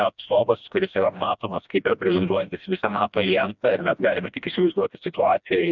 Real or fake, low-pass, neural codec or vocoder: fake; 7.2 kHz; codec, 16 kHz, 1 kbps, FreqCodec, smaller model